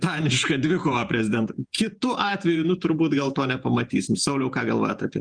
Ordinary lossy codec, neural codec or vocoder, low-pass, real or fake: Opus, 32 kbps; vocoder, 48 kHz, 128 mel bands, Vocos; 9.9 kHz; fake